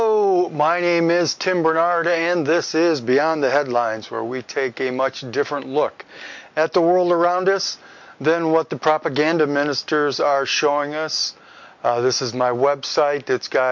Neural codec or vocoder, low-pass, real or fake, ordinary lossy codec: none; 7.2 kHz; real; MP3, 48 kbps